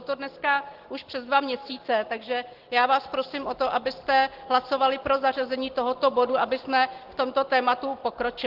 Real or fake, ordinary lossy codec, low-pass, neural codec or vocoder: real; Opus, 16 kbps; 5.4 kHz; none